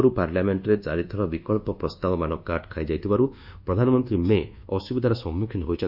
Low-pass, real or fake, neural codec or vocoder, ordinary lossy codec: 5.4 kHz; fake; codec, 24 kHz, 1.2 kbps, DualCodec; none